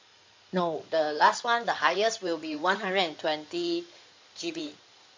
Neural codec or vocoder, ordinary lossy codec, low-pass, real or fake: codec, 16 kHz in and 24 kHz out, 2.2 kbps, FireRedTTS-2 codec; MP3, 64 kbps; 7.2 kHz; fake